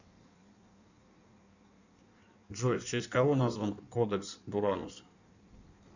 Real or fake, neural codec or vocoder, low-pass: fake; codec, 16 kHz in and 24 kHz out, 1.1 kbps, FireRedTTS-2 codec; 7.2 kHz